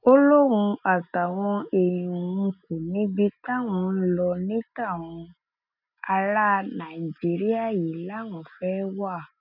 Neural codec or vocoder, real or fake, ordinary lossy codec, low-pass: none; real; none; 5.4 kHz